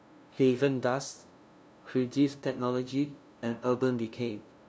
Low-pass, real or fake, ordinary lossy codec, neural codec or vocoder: none; fake; none; codec, 16 kHz, 0.5 kbps, FunCodec, trained on LibriTTS, 25 frames a second